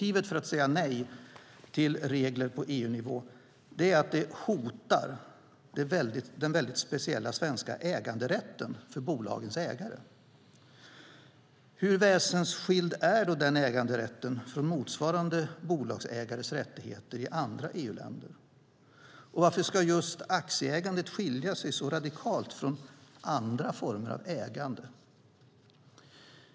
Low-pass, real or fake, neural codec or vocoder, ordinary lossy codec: none; real; none; none